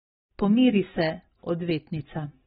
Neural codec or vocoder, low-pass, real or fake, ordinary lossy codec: none; 19.8 kHz; real; AAC, 16 kbps